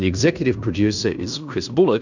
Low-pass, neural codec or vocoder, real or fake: 7.2 kHz; codec, 16 kHz in and 24 kHz out, 0.9 kbps, LongCat-Audio-Codec, fine tuned four codebook decoder; fake